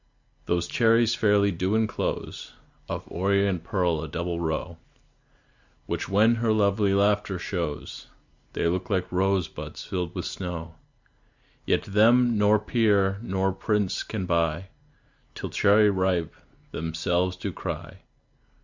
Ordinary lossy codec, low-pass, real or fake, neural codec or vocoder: Opus, 64 kbps; 7.2 kHz; real; none